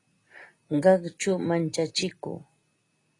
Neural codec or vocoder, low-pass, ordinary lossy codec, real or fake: none; 10.8 kHz; AAC, 32 kbps; real